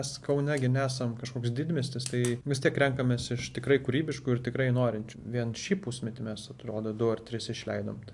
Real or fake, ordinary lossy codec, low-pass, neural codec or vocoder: real; AAC, 64 kbps; 10.8 kHz; none